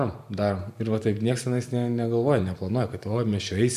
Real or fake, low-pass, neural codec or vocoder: fake; 14.4 kHz; vocoder, 44.1 kHz, 128 mel bands every 512 samples, BigVGAN v2